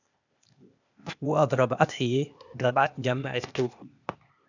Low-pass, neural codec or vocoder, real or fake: 7.2 kHz; codec, 16 kHz, 0.8 kbps, ZipCodec; fake